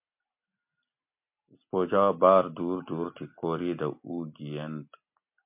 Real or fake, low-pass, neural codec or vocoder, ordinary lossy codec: real; 3.6 kHz; none; MP3, 32 kbps